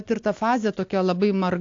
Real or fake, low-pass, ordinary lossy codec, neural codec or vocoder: real; 7.2 kHz; AAC, 48 kbps; none